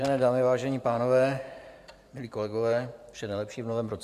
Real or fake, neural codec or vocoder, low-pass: fake; vocoder, 44.1 kHz, 128 mel bands every 512 samples, BigVGAN v2; 14.4 kHz